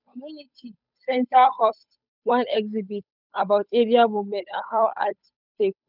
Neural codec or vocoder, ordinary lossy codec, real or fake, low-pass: codec, 16 kHz, 8 kbps, FunCodec, trained on Chinese and English, 25 frames a second; none; fake; 5.4 kHz